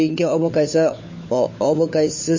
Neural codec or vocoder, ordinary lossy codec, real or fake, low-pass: codec, 16 kHz, 4 kbps, FunCodec, trained on LibriTTS, 50 frames a second; MP3, 32 kbps; fake; 7.2 kHz